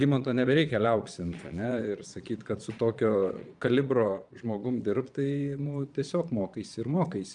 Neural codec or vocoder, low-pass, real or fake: vocoder, 22.05 kHz, 80 mel bands, Vocos; 9.9 kHz; fake